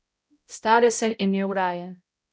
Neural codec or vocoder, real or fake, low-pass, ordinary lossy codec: codec, 16 kHz, 0.5 kbps, X-Codec, HuBERT features, trained on balanced general audio; fake; none; none